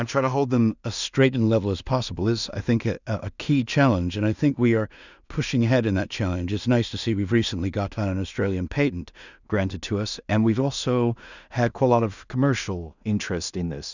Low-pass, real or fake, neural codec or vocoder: 7.2 kHz; fake; codec, 16 kHz in and 24 kHz out, 0.4 kbps, LongCat-Audio-Codec, two codebook decoder